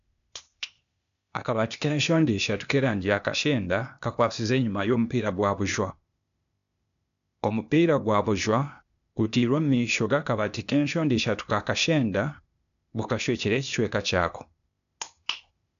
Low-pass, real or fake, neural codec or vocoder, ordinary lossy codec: 7.2 kHz; fake; codec, 16 kHz, 0.8 kbps, ZipCodec; none